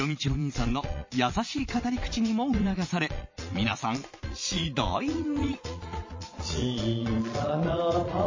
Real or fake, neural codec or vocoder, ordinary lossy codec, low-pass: fake; vocoder, 22.05 kHz, 80 mel bands, Vocos; MP3, 32 kbps; 7.2 kHz